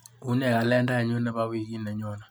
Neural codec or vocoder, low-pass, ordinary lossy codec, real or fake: none; none; none; real